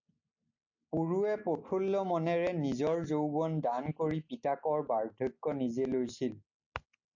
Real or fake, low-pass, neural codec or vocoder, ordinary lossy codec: real; 7.2 kHz; none; AAC, 48 kbps